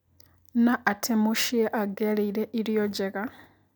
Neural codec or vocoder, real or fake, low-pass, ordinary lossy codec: none; real; none; none